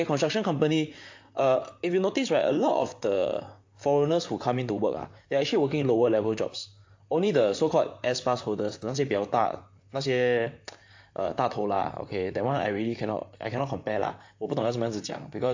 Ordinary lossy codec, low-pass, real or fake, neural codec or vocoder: AAC, 48 kbps; 7.2 kHz; fake; vocoder, 44.1 kHz, 128 mel bands, Pupu-Vocoder